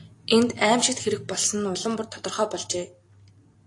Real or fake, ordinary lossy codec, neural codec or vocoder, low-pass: real; AAC, 48 kbps; none; 10.8 kHz